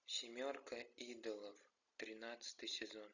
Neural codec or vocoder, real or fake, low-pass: none; real; 7.2 kHz